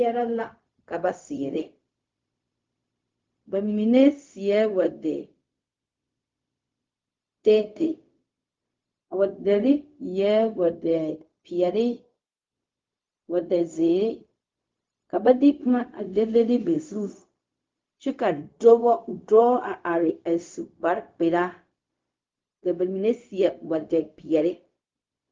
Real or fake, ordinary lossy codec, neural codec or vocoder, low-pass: fake; Opus, 32 kbps; codec, 16 kHz, 0.4 kbps, LongCat-Audio-Codec; 7.2 kHz